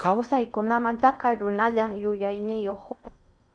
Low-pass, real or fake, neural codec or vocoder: 9.9 kHz; fake; codec, 16 kHz in and 24 kHz out, 0.6 kbps, FocalCodec, streaming, 4096 codes